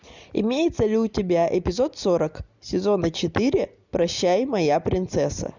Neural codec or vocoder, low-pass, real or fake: none; 7.2 kHz; real